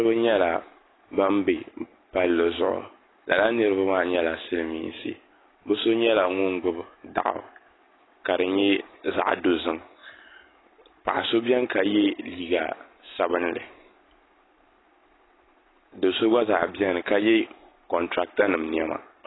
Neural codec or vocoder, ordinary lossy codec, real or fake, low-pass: none; AAC, 16 kbps; real; 7.2 kHz